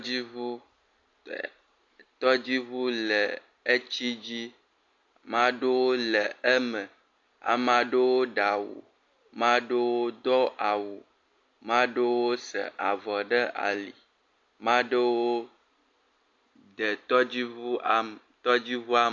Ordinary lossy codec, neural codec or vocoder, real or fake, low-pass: AAC, 48 kbps; none; real; 7.2 kHz